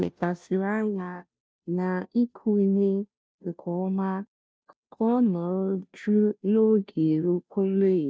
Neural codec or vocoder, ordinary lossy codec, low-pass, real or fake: codec, 16 kHz, 0.5 kbps, FunCodec, trained on Chinese and English, 25 frames a second; none; none; fake